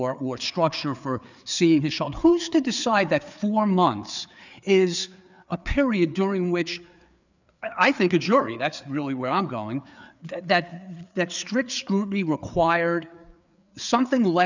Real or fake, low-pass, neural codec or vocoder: fake; 7.2 kHz; codec, 16 kHz, 4 kbps, FreqCodec, larger model